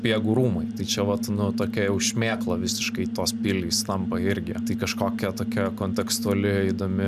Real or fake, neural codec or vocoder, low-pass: real; none; 14.4 kHz